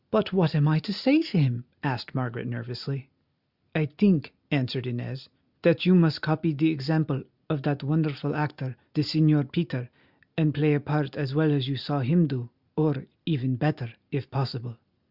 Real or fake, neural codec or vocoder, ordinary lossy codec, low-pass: real; none; Opus, 64 kbps; 5.4 kHz